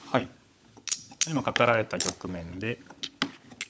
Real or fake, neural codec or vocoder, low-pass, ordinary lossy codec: fake; codec, 16 kHz, 16 kbps, FunCodec, trained on LibriTTS, 50 frames a second; none; none